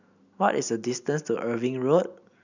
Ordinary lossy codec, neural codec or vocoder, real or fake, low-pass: none; none; real; 7.2 kHz